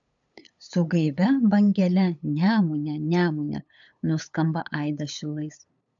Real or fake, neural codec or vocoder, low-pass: fake; codec, 16 kHz, 8 kbps, FunCodec, trained on LibriTTS, 25 frames a second; 7.2 kHz